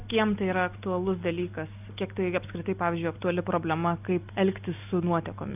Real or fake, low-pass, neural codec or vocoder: real; 3.6 kHz; none